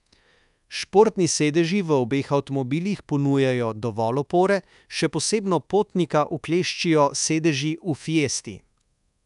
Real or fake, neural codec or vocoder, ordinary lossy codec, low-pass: fake; codec, 24 kHz, 1.2 kbps, DualCodec; none; 10.8 kHz